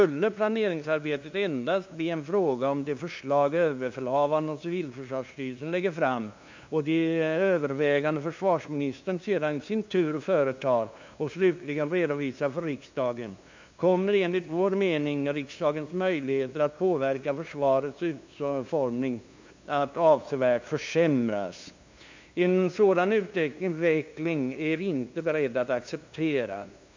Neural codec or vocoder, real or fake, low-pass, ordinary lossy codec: codec, 16 kHz, 2 kbps, FunCodec, trained on LibriTTS, 25 frames a second; fake; 7.2 kHz; MP3, 64 kbps